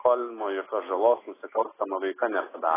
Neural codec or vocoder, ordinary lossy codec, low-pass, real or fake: none; AAC, 16 kbps; 3.6 kHz; real